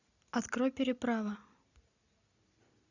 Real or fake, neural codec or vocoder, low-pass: real; none; 7.2 kHz